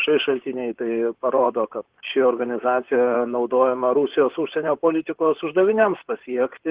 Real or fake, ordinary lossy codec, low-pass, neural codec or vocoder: fake; Opus, 16 kbps; 3.6 kHz; vocoder, 44.1 kHz, 128 mel bands, Pupu-Vocoder